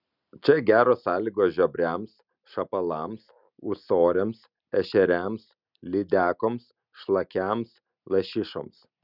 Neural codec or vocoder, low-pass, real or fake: none; 5.4 kHz; real